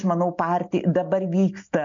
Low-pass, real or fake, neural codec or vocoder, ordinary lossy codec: 7.2 kHz; real; none; MP3, 64 kbps